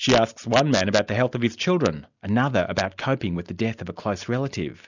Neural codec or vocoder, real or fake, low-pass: none; real; 7.2 kHz